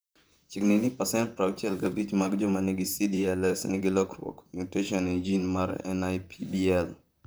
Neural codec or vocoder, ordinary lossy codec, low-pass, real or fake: vocoder, 44.1 kHz, 128 mel bands, Pupu-Vocoder; none; none; fake